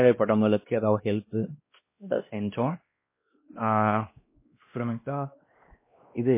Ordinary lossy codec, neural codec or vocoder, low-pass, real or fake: MP3, 24 kbps; codec, 16 kHz, 1 kbps, X-Codec, WavLM features, trained on Multilingual LibriSpeech; 3.6 kHz; fake